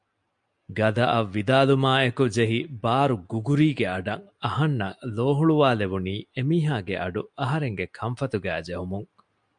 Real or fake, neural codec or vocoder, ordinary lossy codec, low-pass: real; none; MP3, 64 kbps; 9.9 kHz